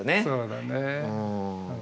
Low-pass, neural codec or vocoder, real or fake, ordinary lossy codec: none; none; real; none